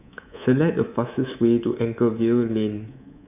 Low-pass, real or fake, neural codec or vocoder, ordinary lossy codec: 3.6 kHz; fake; codec, 24 kHz, 3.1 kbps, DualCodec; none